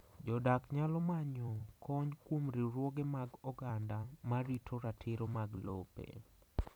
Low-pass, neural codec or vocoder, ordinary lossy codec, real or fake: none; vocoder, 44.1 kHz, 128 mel bands every 256 samples, BigVGAN v2; none; fake